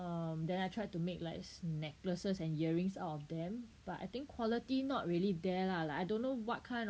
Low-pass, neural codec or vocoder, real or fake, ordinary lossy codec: none; none; real; none